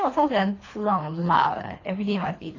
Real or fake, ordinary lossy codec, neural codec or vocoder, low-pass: fake; AAC, 32 kbps; codec, 24 kHz, 3 kbps, HILCodec; 7.2 kHz